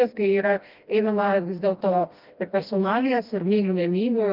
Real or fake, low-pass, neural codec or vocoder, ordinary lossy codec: fake; 5.4 kHz; codec, 16 kHz, 1 kbps, FreqCodec, smaller model; Opus, 32 kbps